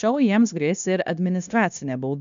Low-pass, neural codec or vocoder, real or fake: 7.2 kHz; codec, 16 kHz, 0.9 kbps, LongCat-Audio-Codec; fake